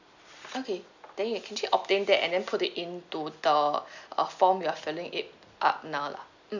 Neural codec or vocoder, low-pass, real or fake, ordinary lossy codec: none; 7.2 kHz; real; none